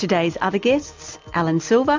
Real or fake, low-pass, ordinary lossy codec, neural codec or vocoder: real; 7.2 kHz; MP3, 64 kbps; none